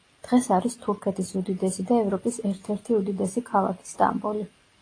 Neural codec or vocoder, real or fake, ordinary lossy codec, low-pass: none; real; AAC, 32 kbps; 9.9 kHz